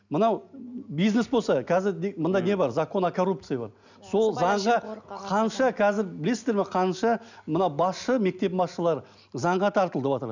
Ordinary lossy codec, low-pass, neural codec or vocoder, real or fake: none; 7.2 kHz; none; real